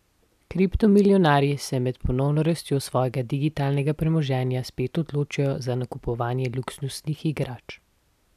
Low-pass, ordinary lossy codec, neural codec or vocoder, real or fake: 14.4 kHz; none; none; real